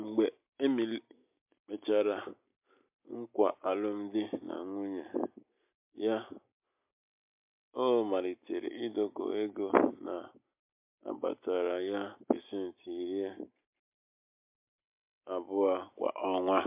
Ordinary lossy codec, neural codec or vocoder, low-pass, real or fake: MP3, 32 kbps; none; 3.6 kHz; real